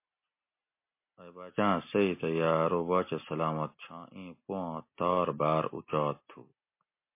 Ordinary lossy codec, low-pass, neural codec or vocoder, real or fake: MP3, 24 kbps; 3.6 kHz; none; real